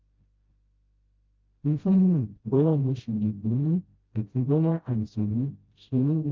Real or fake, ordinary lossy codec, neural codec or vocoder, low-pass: fake; Opus, 24 kbps; codec, 16 kHz, 0.5 kbps, FreqCodec, smaller model; 7.2 kHz